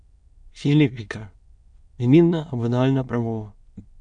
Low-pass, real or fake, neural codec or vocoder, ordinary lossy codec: 9.9 kHz; fake; autoencoder, 22.05 kHz, a latent of 192 numbers a frame, VITS, trained on many speakers; MP3, 64 kbps